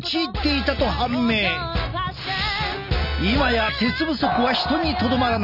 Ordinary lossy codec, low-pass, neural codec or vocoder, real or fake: none; 5.4 kHz; none; real